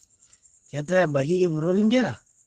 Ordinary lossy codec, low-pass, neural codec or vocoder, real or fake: Opus, 16 kbps; 14.4 kHz; codec, 32 kHz, 1.9 kbps, SNAC; fake